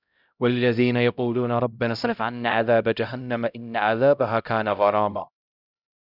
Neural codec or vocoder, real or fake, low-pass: codec, 16 kHz, 0.5 kbps, X-Codec, HuBERT features, trained on LibriSpeech; fake; 5.4 kHz